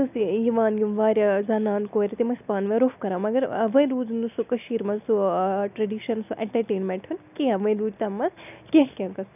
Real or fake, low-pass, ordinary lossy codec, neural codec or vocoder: real; 3.6 kHz; none; none